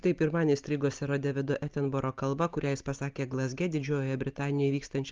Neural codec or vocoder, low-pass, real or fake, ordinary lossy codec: none; 7.2 kHz; real; Opus, 32 kbps